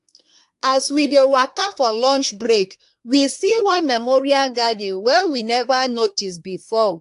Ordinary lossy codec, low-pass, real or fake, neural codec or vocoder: AAC, 64 kbps; 10.8 kHz; fake; codec, 24 kHz, 1 kbps, SNAC